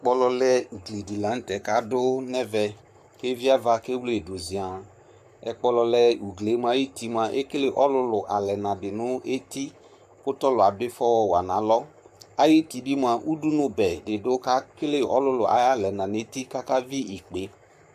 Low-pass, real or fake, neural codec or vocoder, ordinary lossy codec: 14.4 kHz; fake; codec, 44.1 kHz, 7.8 kbps, Pupu-Codec; AAC, 96 kbps